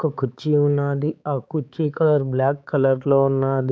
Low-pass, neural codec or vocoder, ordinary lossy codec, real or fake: none; codec, 16 kHz, 4 kbps, X-Codec, HuBERT features, trained on balanced general audio; none; fake